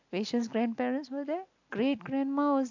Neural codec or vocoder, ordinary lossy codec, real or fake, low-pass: none; AAC, 48 kbps; real; 7.2 kHz